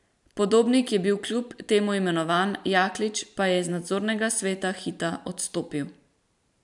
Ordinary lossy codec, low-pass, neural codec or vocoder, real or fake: none; 10.8 kHz; vocoder, 44.1 kHz, 128 mel bands every 512 samples, BigVGAN v2; fake